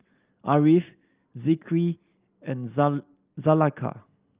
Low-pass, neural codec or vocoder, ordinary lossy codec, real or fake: 3.6 kHz; none; Opus, 32 kbps; real